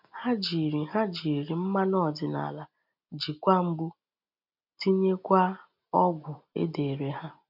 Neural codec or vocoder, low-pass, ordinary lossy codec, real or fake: none; 5.4 kHz; none; real